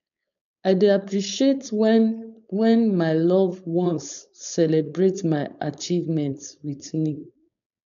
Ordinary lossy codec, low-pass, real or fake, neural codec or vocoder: none; 7.2 kHz; fake; codec, 16 kHz, 4.8 kbps, FACodec